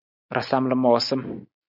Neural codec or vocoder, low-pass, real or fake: none; 5.4 kHz; real